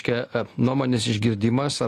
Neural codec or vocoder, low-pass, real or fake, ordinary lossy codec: none; 14.4 kHz; real; AAC, 48 kbps